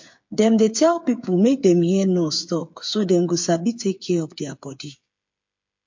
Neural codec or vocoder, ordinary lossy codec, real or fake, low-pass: codec, 16 kHz, 8 kbps, FreqCodec, smaller model; MP3, 48 kbps; fake; 7.2 kHz